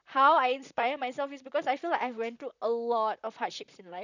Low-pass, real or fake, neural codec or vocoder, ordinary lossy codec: 7.2 kHz; fake; vocoder, 44.1 kHz, 128 mel bands, Pupu-Vocoder; none